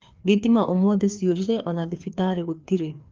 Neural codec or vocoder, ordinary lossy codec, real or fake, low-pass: codec, 16 kHz, 2 kbps, FreqCodec, larger model; Opus, 24 kbps; fake; 7.2 kHz